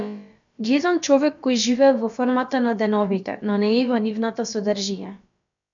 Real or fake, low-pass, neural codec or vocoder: fake; 7.2 kHz; codec, 16 kHz, about 1 kbps, DyCAST, with the encoder's durations